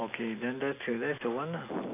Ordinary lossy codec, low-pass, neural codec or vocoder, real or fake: none; 3.6 kHz; none; real